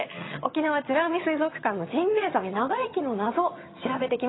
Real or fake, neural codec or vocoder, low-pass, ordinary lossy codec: fake; vocoder, 22.05 kHz, 80 mel bands, HiFi-GAN; 7.2 kHz; AAC, 16 kbps